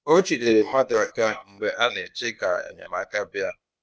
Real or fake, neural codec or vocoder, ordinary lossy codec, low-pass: fake; codec, 16 kHz, 0.8 kbps, ZipCodec; none; none